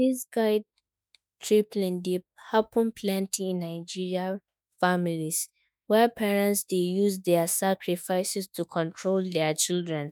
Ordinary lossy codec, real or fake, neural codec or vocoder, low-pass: none; fake; autoencoder, 48 kHz, 32 numbers a frame, DAC-VAE, trained on Japanese speech; none